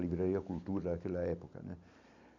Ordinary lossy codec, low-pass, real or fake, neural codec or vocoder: none; 7.2 kHz; real; none